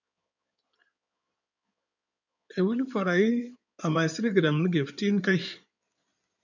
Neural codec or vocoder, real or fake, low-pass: codec, 16 kHz in and 24 kHz out, 2.2 kbps, FireRedTTS-2 codec; fake; 7.2 kHz